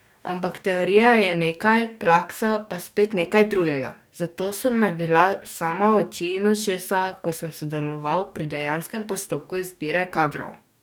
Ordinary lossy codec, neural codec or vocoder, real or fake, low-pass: none; codec, 44.1 kHz, 2.6 kbps, DAC; fake; none